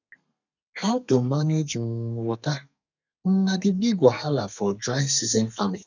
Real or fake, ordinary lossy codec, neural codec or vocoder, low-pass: fake; none; codec, 32 kHz, 1.9 kbps, SNAC; 7.2 kHz